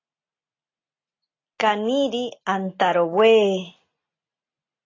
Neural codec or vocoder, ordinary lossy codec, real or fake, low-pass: none; AAC, 32 kbps; real; 7.2 kHz